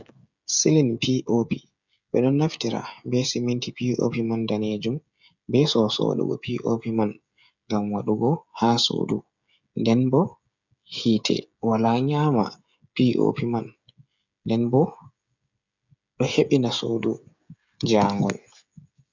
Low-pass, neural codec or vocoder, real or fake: 7.2 kHz; codec, 16 kHz, 16 kbps, FreqCodec, smaller model; fake